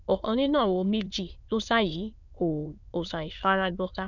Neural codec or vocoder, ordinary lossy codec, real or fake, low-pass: autoencoder, 22.05 kHz, a latent of 192 numbers a frame, VITS, trained on many speakers; none; fake; 7.2 kHz